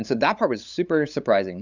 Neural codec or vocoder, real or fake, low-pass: codec, 16 kHz, 8 kbps, FunCodec, trained on LibriTTS, 25 frames a second; fake; 7.2 kHz